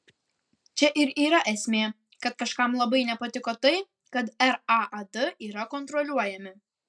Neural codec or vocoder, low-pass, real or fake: none; 9.9 kHz; real